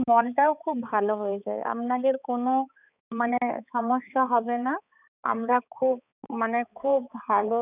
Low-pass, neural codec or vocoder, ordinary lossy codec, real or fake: 3.6 kHz; codec, 16 kHz, 4 kbps, X-Codec, HuBERT features, trained on balanced general audio; none; fake